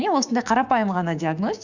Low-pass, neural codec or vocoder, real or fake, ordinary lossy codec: 7.2 kHz; codec, 16 kHz, 6 kbps, DAC; fake; Opus, 64 kbps